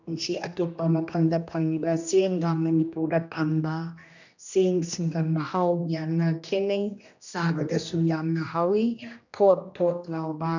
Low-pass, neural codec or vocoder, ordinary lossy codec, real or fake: 7.2 kHz; codec, 16 kHz, 1 kbps, X-Codec, HuBERT features, trained on general audio; none; fake